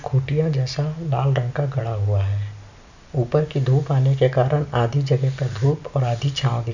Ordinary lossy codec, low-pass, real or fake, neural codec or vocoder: none; 7.2 kHz; real; none